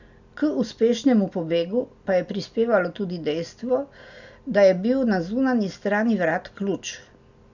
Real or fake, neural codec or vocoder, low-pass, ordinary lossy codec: real; none; 7.2 kHz; none